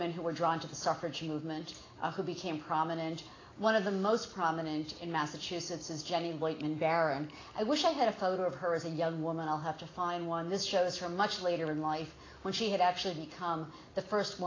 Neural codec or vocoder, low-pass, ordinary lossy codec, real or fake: none; 7.2 kHz; AAC, 32 kbps; real